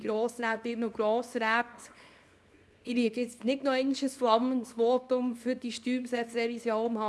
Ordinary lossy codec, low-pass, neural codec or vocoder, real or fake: none; none; codec, 24 kHz, 0.9 kbps, WavTokenizer, small release; fake